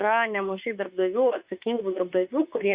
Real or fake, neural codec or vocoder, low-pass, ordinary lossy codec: fake; autoencoder, 48 kHz, 32 numbers a frame, DAC-VAE, trained on Japanese speech; 3.6 kHz; Opus, 64 kbps